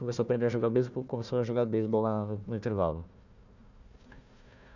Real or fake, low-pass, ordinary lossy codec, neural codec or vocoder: fake; 7.2 kHz; none; codec, 16 kHz, 1 kbps, FunCodec, trained on Chinese and English, 50 frames a second